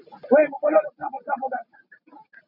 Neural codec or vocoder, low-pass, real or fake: none; 5.4 kHz; real